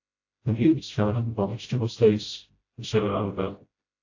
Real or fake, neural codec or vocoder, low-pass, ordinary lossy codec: fake; codec, 16 kHz, 0.5 kbps, FreqCodec, smaller model; 7.2 kHz; AAC, 48 kbps